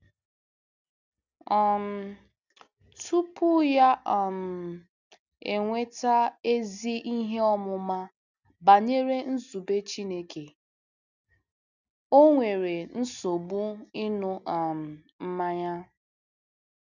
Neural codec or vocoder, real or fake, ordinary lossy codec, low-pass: none; real; none; 7.2 kHz